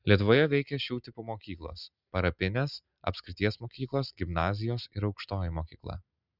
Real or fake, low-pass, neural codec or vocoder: real; 5.4 kHz; none